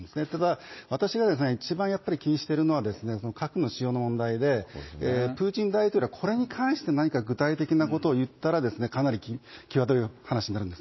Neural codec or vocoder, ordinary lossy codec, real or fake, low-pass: none; MP3, 24 kbps; real; 7.2 kHz